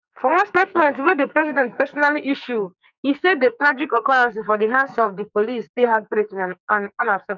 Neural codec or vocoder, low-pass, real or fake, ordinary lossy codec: codec, 44.1 kHz, 2.6 kbps, SNAC; 7.2 kHz; fake; none